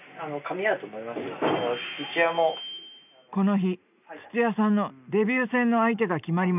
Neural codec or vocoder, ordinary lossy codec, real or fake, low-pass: none; none; real; 3.6 kHz